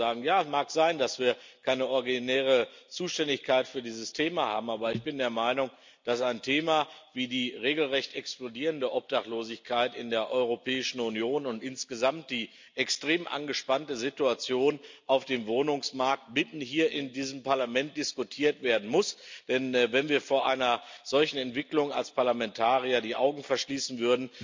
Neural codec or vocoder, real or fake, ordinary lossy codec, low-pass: none; real; MP3, 64 kbps; 7.2 kHz